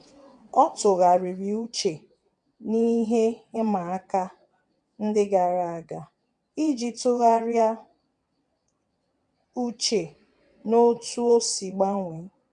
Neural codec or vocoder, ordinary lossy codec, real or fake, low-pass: vocoder, 22.05 kHz, 80 mel bands, WaveNeXt; none; fake; 9.9 kHz